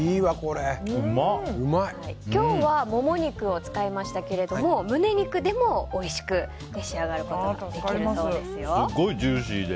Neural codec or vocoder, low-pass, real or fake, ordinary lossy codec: none; none; real; none